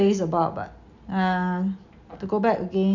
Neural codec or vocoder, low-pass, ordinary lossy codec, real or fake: none; 7.2 kHz; none; real